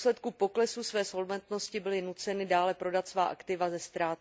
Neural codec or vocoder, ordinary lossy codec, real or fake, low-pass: none; none; real; none